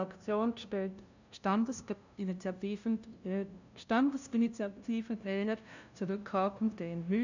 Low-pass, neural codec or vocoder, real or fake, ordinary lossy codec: 7.2 kHz; codec, 16 kHz, 0.5 kbps, FunCodec, trained on LibriTTS, 25 frames a second; fake; none